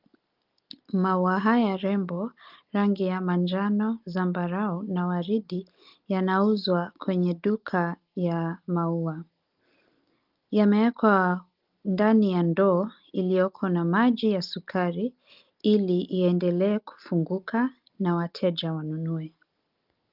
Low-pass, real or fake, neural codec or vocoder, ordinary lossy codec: 5.4 kHz; real; none; Opus, 32 kbps